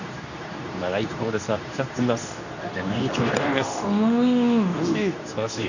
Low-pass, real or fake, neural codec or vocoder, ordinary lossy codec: 7.2 kHz; fake; codec, 24 kHz, 0.9 kbps, WavTokenizer, medium speech release version 2; none